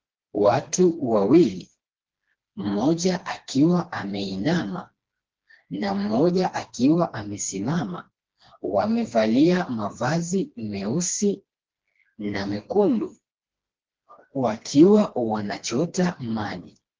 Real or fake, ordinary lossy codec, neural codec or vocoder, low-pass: fake; Opus, 16 kbps; codec, 16 kHz, 2 kbps, FreqCodec, smaller model; 7.2 kHz